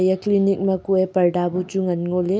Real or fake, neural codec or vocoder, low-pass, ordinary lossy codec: real; none; none; none